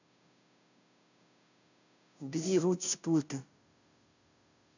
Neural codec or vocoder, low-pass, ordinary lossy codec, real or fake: codec, 16 kHz, 0.5 kbps, FunCodec, trained on Chinese and English, 25 frames a second; 7.2 kHz; none; fake